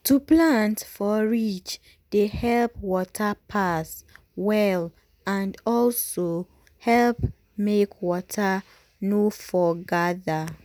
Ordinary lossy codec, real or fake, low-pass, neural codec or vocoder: none; real; none; none